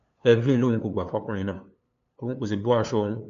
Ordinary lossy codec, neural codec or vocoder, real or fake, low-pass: MP3, 64 kbps; codec, 16 kHz, 2 kbps, FunCodec, trained on LibriTTS, 25 frames a second; fake; 7.2 kHz